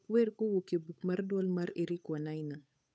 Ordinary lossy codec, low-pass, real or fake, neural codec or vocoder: none; none; fake; codec, 16 kHz, 8 kbps, FunCodec, trained on Chinese and English, 25 frames a second